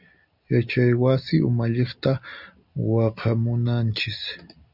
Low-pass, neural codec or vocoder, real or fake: 5.4 kHz; none; real